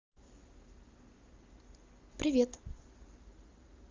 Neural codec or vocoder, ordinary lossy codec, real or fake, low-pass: none; Opus, 32 kbps; real; 7.2 kHz